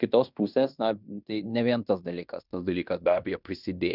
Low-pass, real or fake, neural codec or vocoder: 5.4 kHz; fake; codec, 16 kHz in and 24 kHz out, 0.9 kbps, LongCat-Audio-Codec, fine tuned four codebook decoder